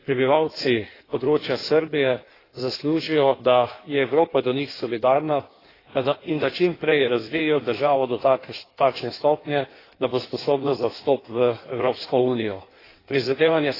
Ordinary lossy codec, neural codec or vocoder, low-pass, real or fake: AAC, 24 kbps; codec, 16 kHz in and 24 kHz out, 1.1 kbps, FireRedTTS-2 codec; 5.4 kHz; fake